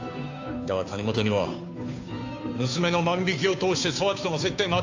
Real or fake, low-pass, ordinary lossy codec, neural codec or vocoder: fake; 7.2 kHz; AAC, 48 kbps; codec, 16 kHz, 2 kbps, FunCodec, trained on Chinese and English, 25 frames a second